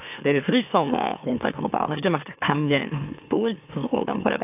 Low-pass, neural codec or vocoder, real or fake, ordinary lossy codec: 3.6 kHz; autoencoder, 44.1 kHz, a latent of 192 numbers a frame, MeloTTS; fake; AAC, 32 kbps